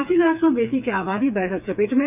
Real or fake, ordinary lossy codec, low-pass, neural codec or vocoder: fake; none; 3.6 kHz; codec, 16 kHz, 4 kbps, FreqCodec, smaller model